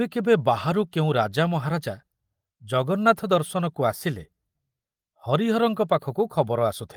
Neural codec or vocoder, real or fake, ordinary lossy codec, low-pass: none; real; Opus, 24 kbps; 19.8 kHz